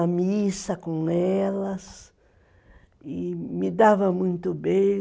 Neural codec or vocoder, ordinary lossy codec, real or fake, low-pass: none; none; real; none